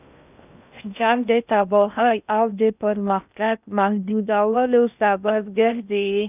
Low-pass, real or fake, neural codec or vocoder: 3.6 kHz; fake; codec, 16 kHz in and 24 kHz out, 0.6 kbps, FocalCodec, streaming, 2048 codes